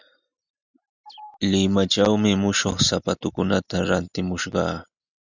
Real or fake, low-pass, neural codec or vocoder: real; 7.2 kHz; none